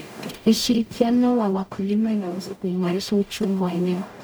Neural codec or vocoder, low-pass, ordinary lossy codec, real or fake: codec, 44.1 kHz, 0.9 kbps, DAC; none; none; fake